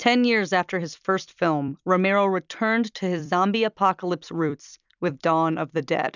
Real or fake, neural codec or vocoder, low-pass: fake; vocoder, 44.1 kHz, 128 mel bands every 256 samples, BigVGAN v2; 7.2 kHz